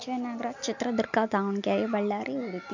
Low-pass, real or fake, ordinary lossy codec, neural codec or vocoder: 7.2 kHz; real; none; none